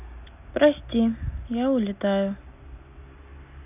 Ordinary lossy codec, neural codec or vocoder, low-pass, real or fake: none; none; 3.6 kHz; real